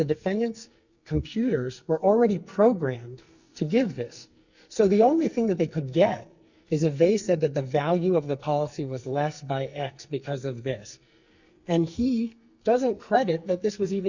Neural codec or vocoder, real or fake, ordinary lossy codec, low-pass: codec, 44.1 kHz, 2.6 kbps, SNAC; fake; Opus, 64 kbps; 7.2 kHz